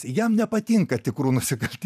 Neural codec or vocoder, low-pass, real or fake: vocoder, 44.1 kHz, 128 mel bands every 512 samples, BigVGAN v2; 14.4 kHz; fake